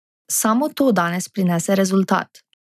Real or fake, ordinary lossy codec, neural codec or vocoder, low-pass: real; none; none; 14.4 kHz